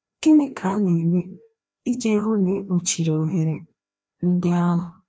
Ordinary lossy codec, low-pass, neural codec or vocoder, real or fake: none; none; codec, 16 kHz, 1 kbps, FreqCodec, larger model; fake